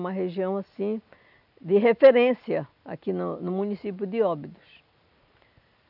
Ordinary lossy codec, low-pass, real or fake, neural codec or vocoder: none; 5.4 kHz; real; none